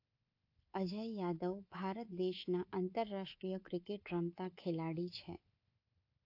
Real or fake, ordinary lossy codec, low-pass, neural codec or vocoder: fake; AAC, 32 kbps; 5.4 kHz; codec, 24 kHz, 3.1 kbps, DualCodec